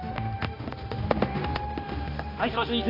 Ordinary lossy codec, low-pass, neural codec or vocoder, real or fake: AAC, 24 kbps; 5.4 kHz; codec, 16 kHz, 1 kbps, X-Codec, HuBERT features, trained on balanced general audio; fake